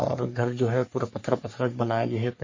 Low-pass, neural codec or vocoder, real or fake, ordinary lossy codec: 7.2 kHz; codec, 44.1 kHz, 3.4 kbps, Pupu-Codec; fake; MP3, 32 kbps